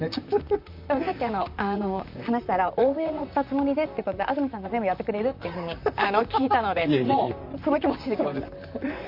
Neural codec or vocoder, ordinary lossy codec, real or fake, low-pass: vocoder, 44.1 kHz, 128 mel bands, Pupu-Vocoder; MP3, 48 kbps; fake; 5.4 kHz